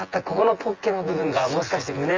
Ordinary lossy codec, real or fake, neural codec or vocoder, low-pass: Opus, 32 kbps; fake; vocoder, 24 kHz, 100 mel bands, Vocos; 7.2 kHz